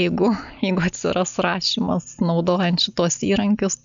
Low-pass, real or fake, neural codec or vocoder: 7.2 kHz; real; none